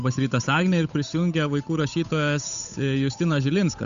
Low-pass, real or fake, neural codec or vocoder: 7.2 kHz; fake; codec, 16 kHz, 8 kbps, FunCodec, trained on Chinese and English, 25 frames a second